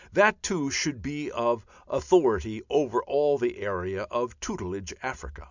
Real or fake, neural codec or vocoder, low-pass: real; none; 7.2 kHz